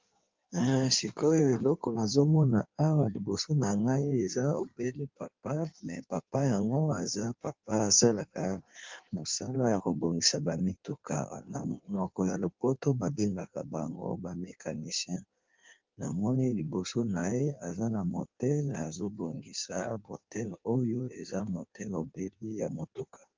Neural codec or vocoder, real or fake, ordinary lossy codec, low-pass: codec, 16 kHz in and 24 kHz out, 1.1 kbps, FireRedTTS-2 codec; fake; Opus, 24 kbps; 7.2 kHz